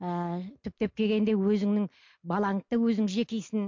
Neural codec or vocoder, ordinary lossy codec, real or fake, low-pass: none; MP3, 48 kbps; real; 7.2 kHz